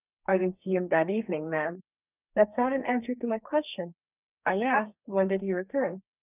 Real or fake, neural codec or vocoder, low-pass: fake; codec, 32 kHz, 1.9 kbps, SNAC; 3.6 kHz